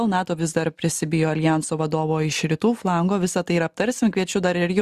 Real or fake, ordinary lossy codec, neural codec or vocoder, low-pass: real; Opus, 64 kbps; none; 14.4 kHz